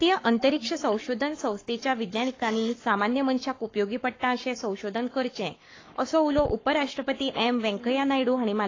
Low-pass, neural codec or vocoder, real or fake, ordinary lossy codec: 7.2 kHz; codec, 44.1 kHz, 7.8 kbps, Pupu-Codec; fake; AAC, 32 kbps